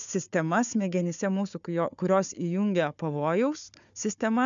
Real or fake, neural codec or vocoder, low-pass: fake; codec, 16 kHz, 4 kbps, FunCodec, trained on Chinese and English, 50 frames a second; 7.2 kHz